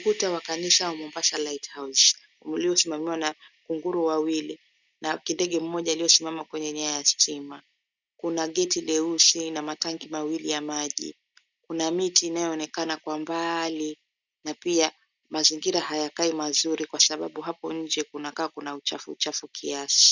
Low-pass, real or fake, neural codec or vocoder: 7.2 kHz; real; none